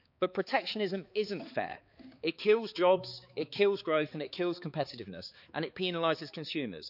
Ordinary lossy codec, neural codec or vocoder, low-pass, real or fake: none; codec, 16 kHz, 4 kbps, X-Codec, HuBERT features, trained on balanced general audio; 5.4 kHz; fake